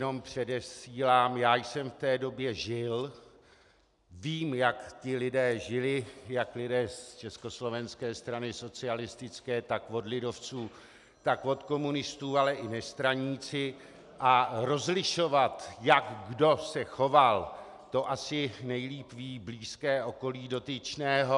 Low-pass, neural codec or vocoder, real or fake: 10.8 kHz; none; real